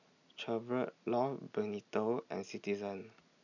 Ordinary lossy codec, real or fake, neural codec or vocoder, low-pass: none; real; none; 7.2 kHz